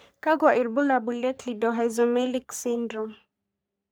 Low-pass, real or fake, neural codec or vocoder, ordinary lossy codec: none; fake; codec, 44.1 kHz, 3.4 kbps, Pupu-Codec; none